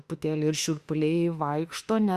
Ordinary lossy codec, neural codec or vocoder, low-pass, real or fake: Opus, 64 kbps; autoencoder, 48 kHz, 32 numbers a frame, DAC-VAE, trained on Japanese speech; 14.4 kHz; fake